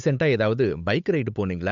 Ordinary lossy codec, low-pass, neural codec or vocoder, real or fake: none; 7.2 kHz; codec, 16 kHz, 8 kbps, FunCodec, trained on Chinese and English, 25 frames a second; fake